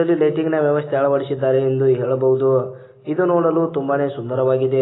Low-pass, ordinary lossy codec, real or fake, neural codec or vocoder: 7.2 kHz; AAC, 16 kbps; real; none